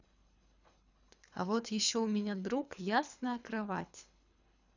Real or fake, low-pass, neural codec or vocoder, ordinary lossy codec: fake; 7.2 kHz; codec, 24 kHz, 3 kbps, HILCodec; none